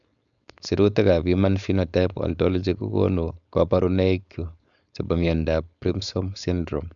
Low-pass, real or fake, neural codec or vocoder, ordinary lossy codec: 7.2 kHz; fake; codec, 16 kHz, 4.8 kbps, FACodec; none